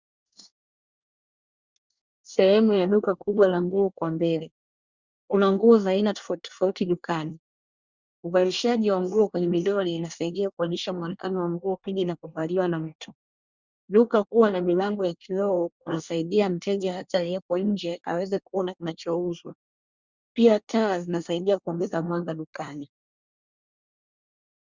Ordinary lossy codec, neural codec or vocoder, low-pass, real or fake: Opus, 64 kbps; codec, 24 kHz, 1 kbps, SNAC; 7.2 kHz; fake